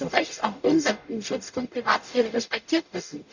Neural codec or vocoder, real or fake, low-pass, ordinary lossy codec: codec, 44.1 kHz, 0.9 kbps, DAC; fake; 7.2 kHz; none